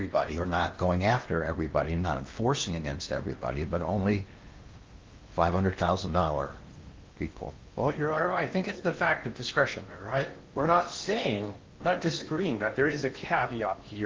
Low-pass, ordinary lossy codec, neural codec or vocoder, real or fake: 7.2 kHz; Opus, 32 kbps; codec, 16 kHz in and 24 kHz out, 0.8 kbps, FocalCodec, streaming, 65536 codes; fake